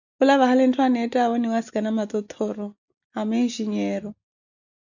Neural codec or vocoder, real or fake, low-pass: none; real; 7.2 kHz